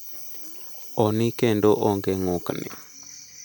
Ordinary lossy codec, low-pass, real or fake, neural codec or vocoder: none; none; real; none